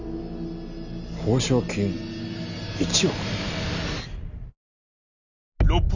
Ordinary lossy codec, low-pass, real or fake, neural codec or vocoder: none; 7.2 kHz; real; none